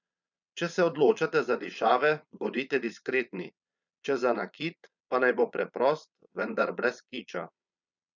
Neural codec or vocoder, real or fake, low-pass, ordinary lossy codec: vocoder, 22.05 kHz, 80 mel bands, Vocos; fake; 7.2 kHz; none